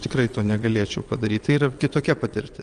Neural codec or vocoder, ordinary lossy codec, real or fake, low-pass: vocoder, 22.05 kHz, 80 mel bands, WaveNeXt; Opus, 32 kbps; fake; 9.9 kHz